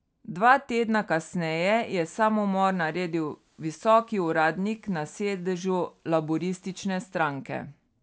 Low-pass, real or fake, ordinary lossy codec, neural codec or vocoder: none; real; none; none